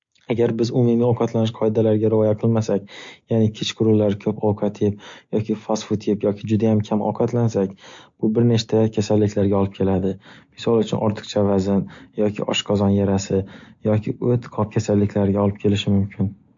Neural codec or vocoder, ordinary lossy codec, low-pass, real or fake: none; MP3, 48 kbps; 7.2 kHz; real